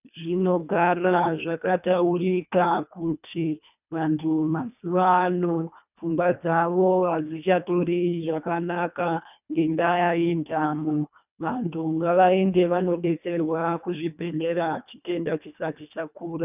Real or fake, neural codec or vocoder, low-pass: fake; codec, 24 kHz, 1.5 kbps, HILCodec; 3.6 kHz